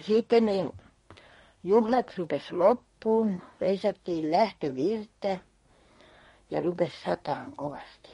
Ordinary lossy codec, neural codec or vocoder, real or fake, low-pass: MP3, 48 kbps; codec, 24 kHz, 1 kbps, SNAC; fake; 10.8 kHz